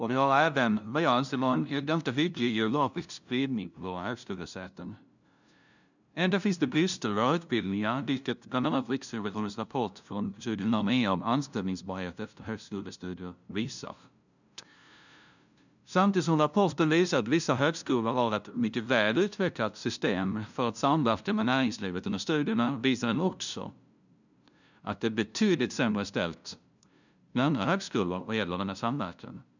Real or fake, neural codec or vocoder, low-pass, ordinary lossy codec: fake; codec, 16 kHz, 0.5 kbps, FunCodec, trained on LibriTTS, 25 frames a second; 7.2 kHz; none